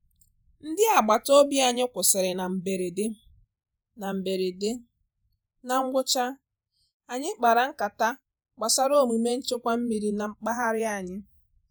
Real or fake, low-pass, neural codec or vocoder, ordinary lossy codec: fake; none; vocoder, 48 kHz, 128 mel bands, Vocos; none